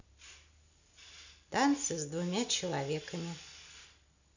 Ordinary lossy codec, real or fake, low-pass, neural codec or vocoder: none; real; 7.2 kHz; none